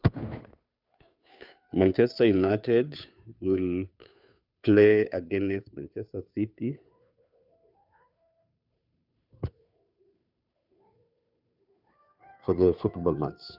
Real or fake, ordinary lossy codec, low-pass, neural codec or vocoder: fake; none; 5.4 kHz; codec, 16 kHz, 2 kbps, FunCodec, trained on Chinese and English, 25 frames a second